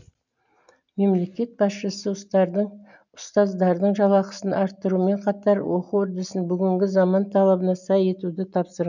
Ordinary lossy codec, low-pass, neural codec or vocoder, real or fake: none; 7.2 kHz; none; real